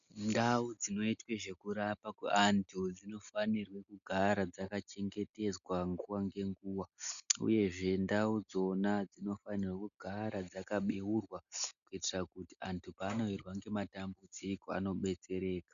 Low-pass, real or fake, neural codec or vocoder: 7.2 kHz; real; none